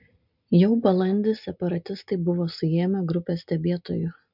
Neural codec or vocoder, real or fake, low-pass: none; real; 5.4 kHz